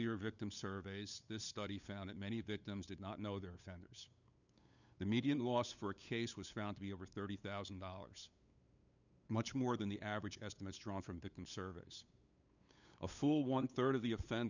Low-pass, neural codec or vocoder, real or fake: 7.2 kHz; codec, 16 kHz, 8 kbps, FunCodec, trained on LibriTTS, 25 frames a second; fake